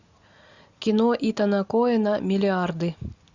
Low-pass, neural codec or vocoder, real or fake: 7.2 kHz; none; real